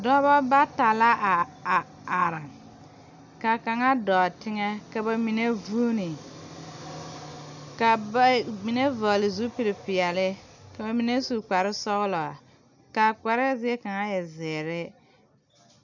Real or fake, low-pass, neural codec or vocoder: real; 7.2 kHz; none